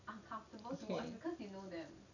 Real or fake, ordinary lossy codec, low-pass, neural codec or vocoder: real; none; 7.2 kHz; none